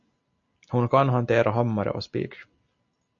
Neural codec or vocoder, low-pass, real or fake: none; 7.2 kHz; real